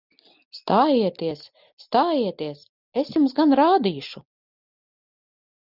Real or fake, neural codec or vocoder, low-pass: real; none; 5.4 kHz